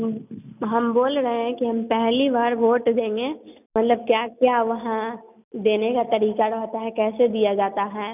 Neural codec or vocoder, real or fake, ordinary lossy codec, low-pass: none; real; none; 3.6 kHz